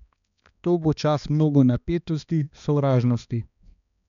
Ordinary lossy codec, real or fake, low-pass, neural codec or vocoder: none; fake; 7.2 kHz; codec, 16 kHz, 2 kbps, X-Codec, HuBERT features, trained on balanced general audio